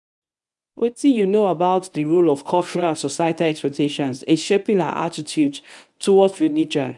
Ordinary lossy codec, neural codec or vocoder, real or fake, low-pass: none; codec, 24 kHz, 0.9 kbps, WavTokenizer, medium speech release version 1; fake; 10.8 kHz